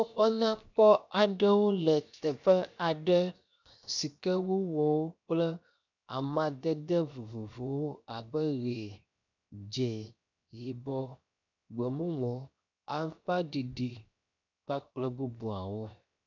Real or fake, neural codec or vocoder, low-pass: fake; codec, 16 kHz, 0.7 kbps, FocalCodec; 7.2 kHz